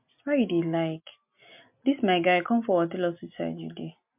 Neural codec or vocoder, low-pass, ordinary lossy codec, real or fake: none; 3.6 kHz; MP3, 32 kbps; real